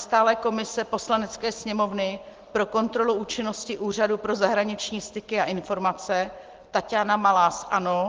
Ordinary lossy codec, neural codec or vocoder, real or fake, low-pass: Opus, 16 kbps; none; real; 7.2 kHz